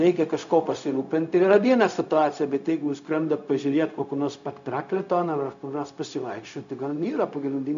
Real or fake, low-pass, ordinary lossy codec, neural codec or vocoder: fake; 7.2 kHz; MP3, 64 kbps; codec, 16 kHz, 0.4 kbps, LongCat-Audio-Codec